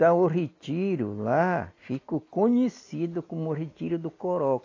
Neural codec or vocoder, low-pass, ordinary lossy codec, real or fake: none; 7.2 kHz; AAC, 32 kbps; real